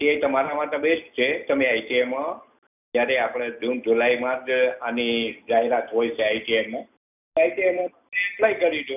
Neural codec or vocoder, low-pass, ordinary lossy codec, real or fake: none; 3.6 kHz; none; real